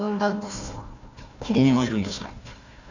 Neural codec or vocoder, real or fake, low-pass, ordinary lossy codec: codec, 16 kHz, 1 kbps, FunCodec, trained on Chinese and English, 50 frames a second; fake; 7.2 kHz; none